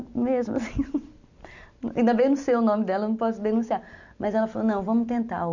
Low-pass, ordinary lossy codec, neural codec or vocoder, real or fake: 7.2 kHz; none; none; real